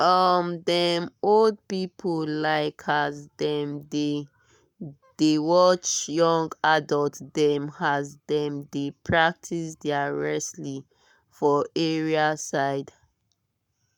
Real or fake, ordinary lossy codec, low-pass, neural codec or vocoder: fake; none; 19.8 kHz; codec, 44.1 kHz, 7.8 kbps, Pupu-Codec